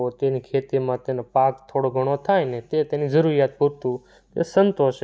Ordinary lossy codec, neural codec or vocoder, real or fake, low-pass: none; none; real; none